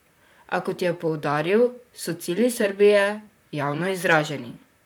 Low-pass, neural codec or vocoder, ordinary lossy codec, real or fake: none; vocoder, 44.1 kHz, 128 mel bands, Pupu-Vocoder; none; fake